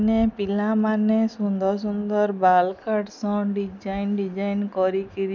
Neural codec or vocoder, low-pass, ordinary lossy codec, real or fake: none; 7.2 kHz; none; real